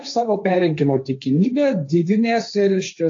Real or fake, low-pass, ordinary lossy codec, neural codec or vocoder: fake; 7.2 kHz; AAC, 48 kbps; codec, 16 kHz, 1.1 kbps, Voila-Tokenizer